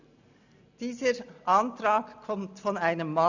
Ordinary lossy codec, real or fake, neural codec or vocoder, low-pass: none; real; none; 7.2 kHz